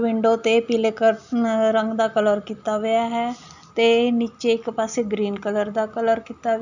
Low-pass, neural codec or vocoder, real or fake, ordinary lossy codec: 7.2 kHz; none; real; none